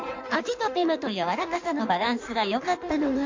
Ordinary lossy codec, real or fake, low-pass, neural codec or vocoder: MP3, 48 kbps; fake; 7.2 kHz; codec, 16 kHz in and 24 kHz out, 1.1 kbps, FireRedTTS-2 codec